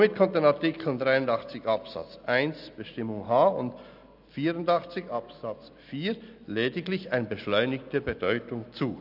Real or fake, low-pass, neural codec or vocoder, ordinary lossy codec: real; 5.4 kHz; none; none